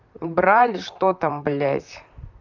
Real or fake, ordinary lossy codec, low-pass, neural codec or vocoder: fake; none; 7.2 kHz; vocoder, 44.1 kHz, 128 mel bands, Pupu-Vocoder